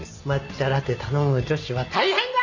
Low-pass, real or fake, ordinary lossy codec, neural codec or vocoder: 7.2 kHz; real; none; none